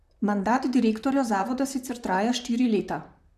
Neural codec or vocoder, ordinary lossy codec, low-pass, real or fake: vocoder, 44.1 kHz, 128 mel bands, Pupu-Vocoder; none; 14.4 kHz; fake